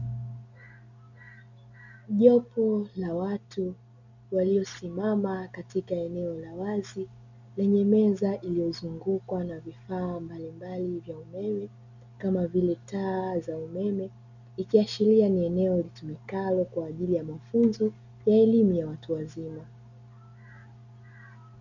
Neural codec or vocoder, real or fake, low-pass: none; real; 7.2 kHz